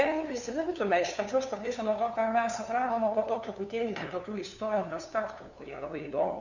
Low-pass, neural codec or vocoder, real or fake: 7.2 kHz; codec, 16 kHz, 2 kbps, FunCodec, trained on LibriTTS, 25 frames a second; fake